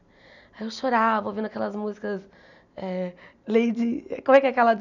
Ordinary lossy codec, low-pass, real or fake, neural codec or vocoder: none; 7.2 kHz; real; none